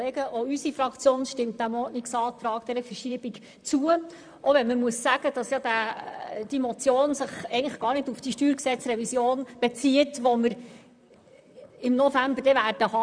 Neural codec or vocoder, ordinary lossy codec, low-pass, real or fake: vocoder, 44.1 kHz, 128 mel bands, Pupu-Vocoder; none; 9.9 kHz; fake